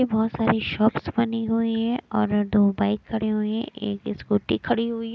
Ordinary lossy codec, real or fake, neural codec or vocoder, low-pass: none; real; none; none